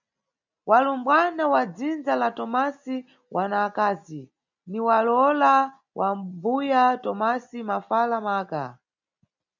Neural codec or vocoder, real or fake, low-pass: none; real; 7.2 kHz